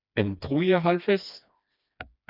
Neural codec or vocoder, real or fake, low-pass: codec, 16 kHz, 2 kbps, FreqCodec, smaller model; fake; 5.4 kHz